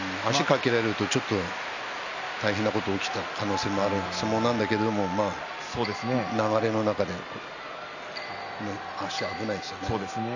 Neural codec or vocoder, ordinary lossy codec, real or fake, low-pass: none; none; real; 7.2 kHz